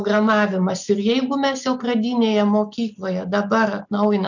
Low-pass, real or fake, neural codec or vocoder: 7.2 kHz; real; none